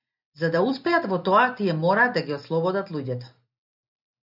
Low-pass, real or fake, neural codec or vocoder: 5.4 kHz; real; none